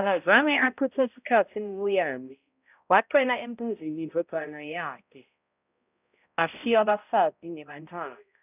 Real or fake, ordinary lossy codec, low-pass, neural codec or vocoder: fake; none; 3.6 kHz; codec, 16 kHz, 0.5 kbps, X-Codec, HuBERT features, trained on balanced general audio